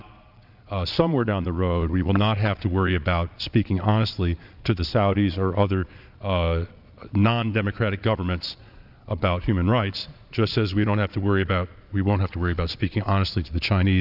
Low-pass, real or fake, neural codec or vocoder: 5.4 kHz; fake; vocoder, 22.05 kHz, 80 mel bands, Vocos